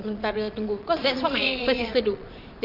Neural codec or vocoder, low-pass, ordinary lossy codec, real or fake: vocoder, 22.05 kHz, 80 mel bands, Vocos; 5.4 kHz; none; fake